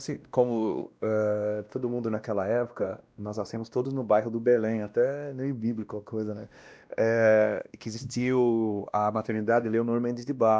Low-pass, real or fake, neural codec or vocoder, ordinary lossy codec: none; fake; codec, 16 kHz, 1 kbps, X-Codec, WavLM features, trained on Multilingual LibriSpeech; none